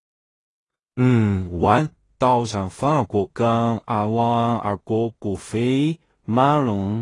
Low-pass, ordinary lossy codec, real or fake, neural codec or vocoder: 10.8 kHz; AAC, 32 kbps; fake; codec, 16 kHz in and 24 kHz out, 0.4 kbps, LongCat-Audio-Codec, two codebook decoder